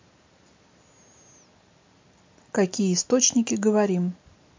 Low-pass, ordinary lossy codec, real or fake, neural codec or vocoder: 7.2 kHz; MP3, 48 kbps; real; none